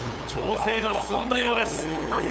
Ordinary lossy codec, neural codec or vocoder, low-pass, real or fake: none; codec, 16 kHz, 8 kbps, FunCodec, trained on LibriTTS, 25 frames a second; none; fake